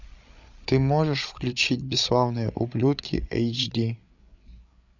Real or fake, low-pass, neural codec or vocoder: fake; 7.2 kHz; codec, 16 kHz, 8 kbps, FreqCodec, larger model